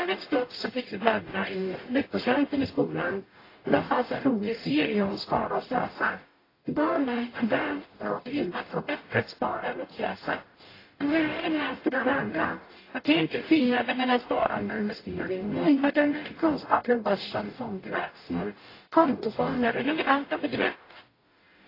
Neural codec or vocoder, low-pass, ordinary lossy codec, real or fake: codec, 44.1 kHz, 0.9 kbps, DAC; 5.4 kHz; AAC, 24 kbps; fake